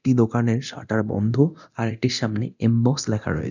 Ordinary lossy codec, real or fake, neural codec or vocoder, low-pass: none; fake; codec, 24 kHz, 0.9 kbps, DualCodec; 7.2 kHz